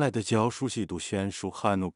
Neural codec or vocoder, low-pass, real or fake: codec, 16 kHz in and 24 kHz out, 0.4 kbps, LongCat-Audio-Codec, two codebook decoder; 10.8 kHz; fake